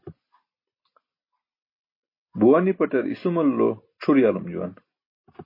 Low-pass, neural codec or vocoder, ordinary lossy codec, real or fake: 5.4 kHz; none; MP3, 24 kbps; real